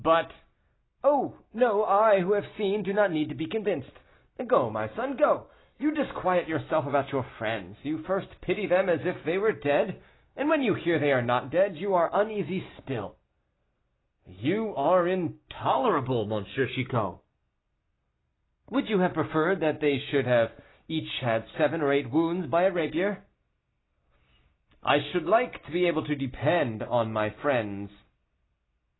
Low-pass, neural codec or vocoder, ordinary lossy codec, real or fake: 7.2 kHz; none; AAC, 16 kbps; real